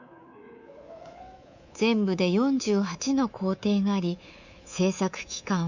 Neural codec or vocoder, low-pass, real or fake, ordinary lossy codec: codec, 24 kHz, 3.1 kbps, DualCodec; 7.2 kHz; fake; none